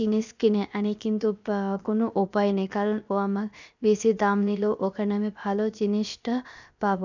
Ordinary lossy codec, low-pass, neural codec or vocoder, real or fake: none; 7.2 kHz; codec, 16 kHz, 0.7 kbps, FocalCodec; fake